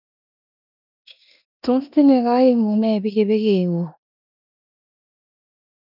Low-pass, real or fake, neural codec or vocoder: 5.4 kHz; fake; codec, 16 kHz in and 24 kHz out, 0.9 kbps, LongCat-Audio-Codec, four codebook decoder